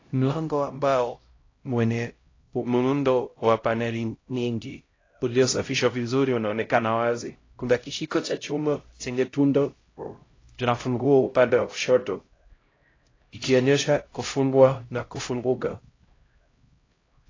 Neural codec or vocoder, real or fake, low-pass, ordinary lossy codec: codec, 16 kHz, 0.5 kbps, X-Codec, HuBERT features, trained on LibriSpeech; fake; 7.2 kHz; AAC, 32 kbps